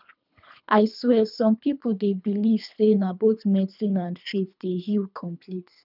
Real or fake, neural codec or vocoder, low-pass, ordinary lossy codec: fake; codec, 24 kHz, 3 kbps, HILCodec; 5.4 kHz; none